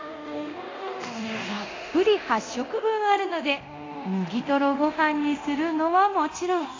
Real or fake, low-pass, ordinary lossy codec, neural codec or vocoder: fake; 7.2 kHz; AAC, 32 kbps; codec, 24 kHz, 0.9 kbps, DualCodec